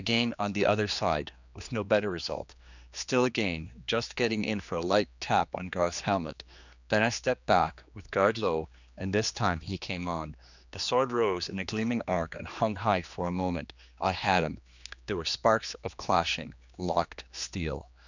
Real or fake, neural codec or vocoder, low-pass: fake; codec, 16 kHz, 2 kbps, X-Codec, HuBERT features, trained on general audio; 7.2 kHz